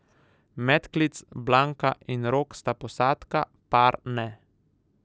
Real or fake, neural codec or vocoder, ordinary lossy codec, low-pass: real; none; none; none